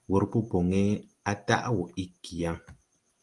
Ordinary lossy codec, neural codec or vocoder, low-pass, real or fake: Opus, 24 kbps; none; 10.8 kHz; real